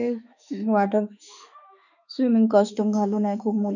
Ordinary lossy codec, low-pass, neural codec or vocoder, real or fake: none; 7.2 kHz; autoencoder, 48 kHz, 32 numbers a frame, DAC-VAE, trained on Japanese speech; fake